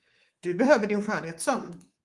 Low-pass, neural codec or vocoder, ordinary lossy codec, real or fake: 10.8 kHz; codec, 24 kHz, 3.1 kbps, DualCodec; Opus, 24 kbps; fake